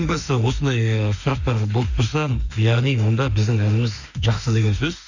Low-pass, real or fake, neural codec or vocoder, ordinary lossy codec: 7.2 kHz; fake; autoencoder, 48 kHz, 32 numbers a frame, DAC-VAE, trained on Japanese speech; none